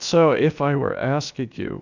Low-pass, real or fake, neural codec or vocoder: 7.2 kHz; fake; codec, 16 kHz, about 1 kbps, DyCAST, with the encoder's durations